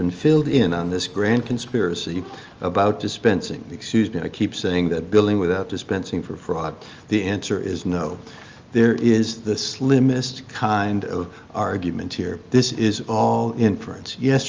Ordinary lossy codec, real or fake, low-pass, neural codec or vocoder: Opus, 24 kbps; real; 7.2 kHz; none